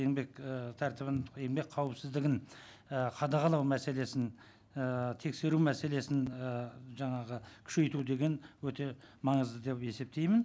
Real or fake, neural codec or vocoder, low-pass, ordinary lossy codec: real; none; none; none